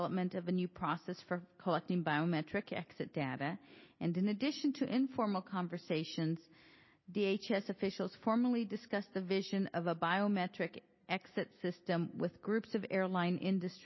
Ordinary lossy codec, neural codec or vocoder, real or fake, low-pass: MP3, 24 kbps; none; real; 7.2 kHz